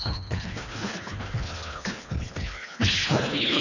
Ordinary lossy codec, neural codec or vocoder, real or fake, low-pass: none; codec, 24 kHz, 1.5 kbps, HILCodec; fake; 7.2 kHz